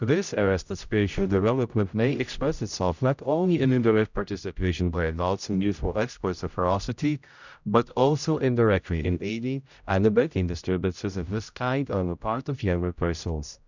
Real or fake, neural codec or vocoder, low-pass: fake; codec, 16 kHz, 0.5 kbps, X-Codec, HuBERT features, trained on general audio; 7.2 kHz